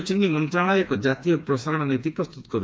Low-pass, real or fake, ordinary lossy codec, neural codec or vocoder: none; fake; none; codec, 16 kHz, 2 kbps, FreqCodec, smaller model